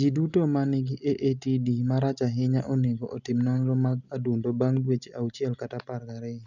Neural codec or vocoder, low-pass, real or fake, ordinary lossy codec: none; 7.2 kHz; real; none